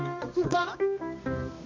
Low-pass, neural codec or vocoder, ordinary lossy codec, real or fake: 7.2 kHz; codec, 24 kHz, 0.9 kbps, WavTokenizer, medium music audio release; MP3, 48 kbps; fake